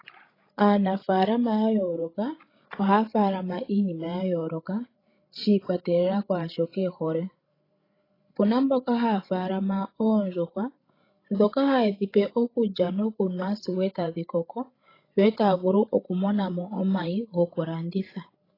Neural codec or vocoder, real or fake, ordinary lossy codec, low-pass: codec, 16 kHz, 16 kbps, FreqCodec, larger model; fake; AAC, 24 kbps; 5.4 kHz